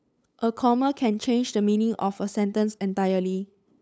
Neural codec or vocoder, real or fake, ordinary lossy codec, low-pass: codec, 16 kHz, 8 kbps, FunCodec, trained on LibriTTS, 25 frames a second; fake; none; none